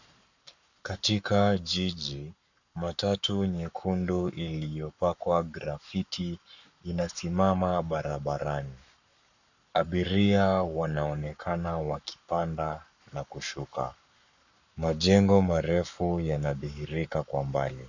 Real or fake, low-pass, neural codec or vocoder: fake; 7.2 kHz; codec, 44.1 kHz, 7.8 kbps, Pupu-Codec